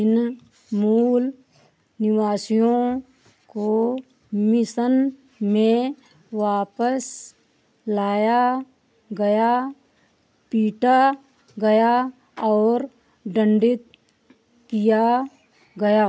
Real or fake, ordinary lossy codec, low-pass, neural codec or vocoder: real; none; none; none